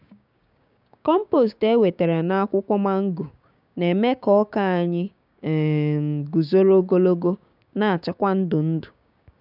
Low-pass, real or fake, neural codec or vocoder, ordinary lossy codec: 5.4 kHz; fake; codec, 16 kHz, 6 kbps, DAC; none